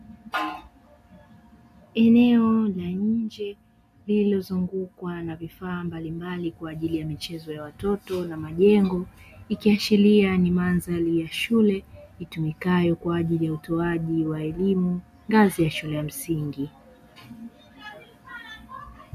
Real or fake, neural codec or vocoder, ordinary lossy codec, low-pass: real; none; MP3, 96 kbps; 14.4 kHz